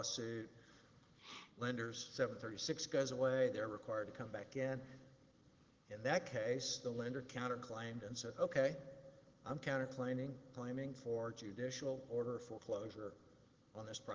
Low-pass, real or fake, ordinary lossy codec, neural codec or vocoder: 7.2 kHz; real; Opus, 16 kbps; none